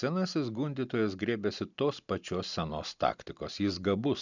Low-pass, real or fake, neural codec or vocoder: 7.2 kHz; real; none